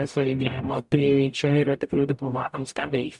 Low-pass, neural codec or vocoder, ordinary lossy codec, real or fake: 10.8 kHz; codec, 44.1 kHz, 0.9 kbps, DAC; MP3, 96 kbps; fake